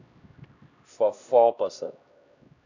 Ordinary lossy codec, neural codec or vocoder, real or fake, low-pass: none; codec, 16 kHz, 1 kbps, X-Codec, HuBERT features, trained on LibriSpeech; fake; 7.2 kHz